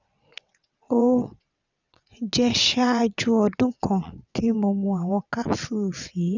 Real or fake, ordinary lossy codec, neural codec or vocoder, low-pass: fake; none; vocoder, 22.05 kHz, 80 mel bands, WaveNeXt; 7.2 kHz